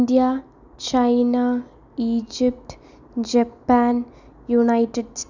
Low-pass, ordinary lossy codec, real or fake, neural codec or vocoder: 7.2 kHz; none; real; none